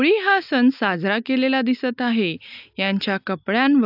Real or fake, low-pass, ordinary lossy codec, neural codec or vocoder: real; 5.4 kHz; AAC, 48 kbps; none